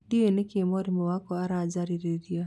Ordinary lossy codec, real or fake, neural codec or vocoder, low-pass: none; real; none; none